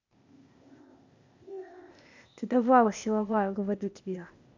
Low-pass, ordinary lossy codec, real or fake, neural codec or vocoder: 7.2 kHz; none; fake; codec, 16 kHz, 0.8 kbps, ZipCodec